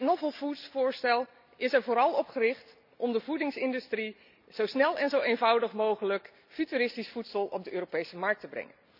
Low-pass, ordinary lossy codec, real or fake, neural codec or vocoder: 5.4 kHz; none; real; none